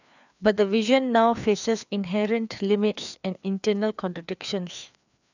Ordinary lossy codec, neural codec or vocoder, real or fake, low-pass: none; codec, 16 kHz, 2 kbps, FreqCodec, larger model; fake; 7.2 kHz